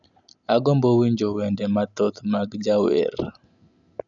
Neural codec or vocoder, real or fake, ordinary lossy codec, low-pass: none; real; none; 7.2 kHz